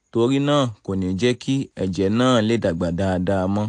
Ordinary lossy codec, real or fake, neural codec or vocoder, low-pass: AAC, 64 kbps; real; none; 9.9 kHz